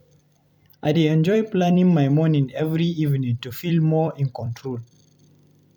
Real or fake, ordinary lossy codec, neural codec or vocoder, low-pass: fake; none; vocoder, 44.1 kHz, 128 mel bands every 256 samples, BigVGAN v2; 19.8 kHz